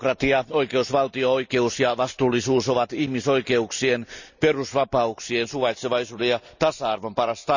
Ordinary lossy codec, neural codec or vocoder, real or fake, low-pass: none; none; real; 7.2 kHz